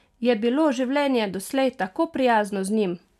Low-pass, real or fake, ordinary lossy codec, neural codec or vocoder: 14.4 kHz; real; none; none